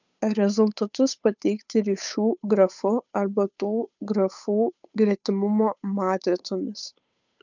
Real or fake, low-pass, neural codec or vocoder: fake; 7.2 kHz; codec, 16 kHz, 8 kbps, FunCodec, trained on Chinese and English, 25 frames a second